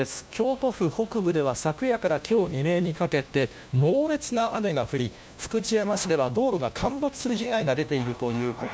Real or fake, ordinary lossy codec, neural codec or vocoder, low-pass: fake; none; codec, 16 kHz, 1 kbps, FunCodec, trained on LibriTTS, 50 frames a second; none